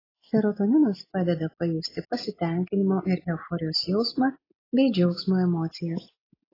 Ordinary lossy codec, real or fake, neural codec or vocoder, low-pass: AAC, 24 kbps; real; none; 5.4 kHz